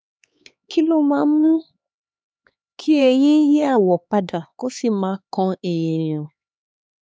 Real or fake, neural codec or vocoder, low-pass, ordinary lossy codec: fake; codec, 16 kHz, 4 kbps, X-Codec, HuBERT features, trained on LibriSpeech; none; none